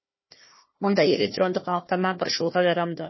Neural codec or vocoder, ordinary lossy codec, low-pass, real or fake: codec, 16 kHz, 1 kbps, FunCodec, trained on Chinese and English, 50 frames a second; MP3, 24 kbps; 7.2 kHz; fake